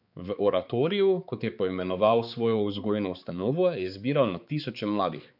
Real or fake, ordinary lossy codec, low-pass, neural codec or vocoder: fake; none; 5.4 kHz; codec, 16 kHz, 4 kbps, X-Codec, HuBERT features, trained on balanced general audio